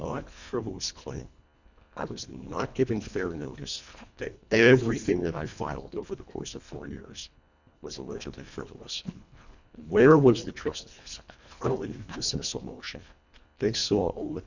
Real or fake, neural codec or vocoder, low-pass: fake; codec, 24 kHz, 1.5 kbps, HILCodec; 7.2 kHz